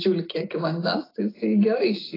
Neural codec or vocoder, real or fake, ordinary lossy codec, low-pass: none; real; AAC, 24 kbps; 5.4 kHz